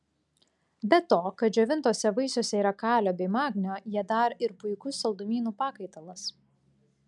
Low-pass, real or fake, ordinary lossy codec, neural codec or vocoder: 10.8 kHz; real; MP3, 96 kbps; none